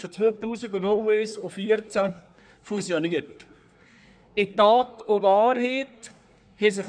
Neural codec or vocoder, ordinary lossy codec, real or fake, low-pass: codec, 24 kHz, 1 kbps, SNAC; none; fake; 9.9 kHz